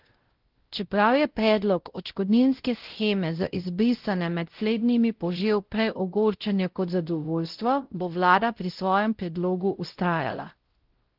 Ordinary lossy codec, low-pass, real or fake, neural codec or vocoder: Opus, 16 kbps; 5.4 kHz; fake; codec, 16 kHz, 0.5 kbps, X-Codec, WavLM features, trained on Multilingual LibriSpeech